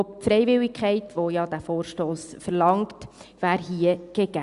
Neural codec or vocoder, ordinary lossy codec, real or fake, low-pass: none; Opus, 64 kbps; real; 10.8 kHz